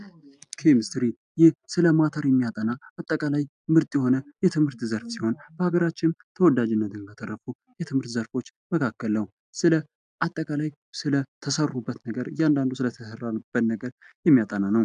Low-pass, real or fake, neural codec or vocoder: 9.9 kHz; real; none